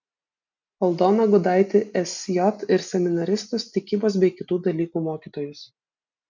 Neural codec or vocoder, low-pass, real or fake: none; 7.2 kHz; real